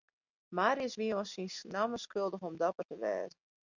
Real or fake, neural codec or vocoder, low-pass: real; none; 7.2 kHz